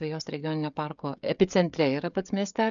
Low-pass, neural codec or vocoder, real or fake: 7.2 kHz; codec, 16 kHz, 16 kbps, FreqCodec, smaller model; fake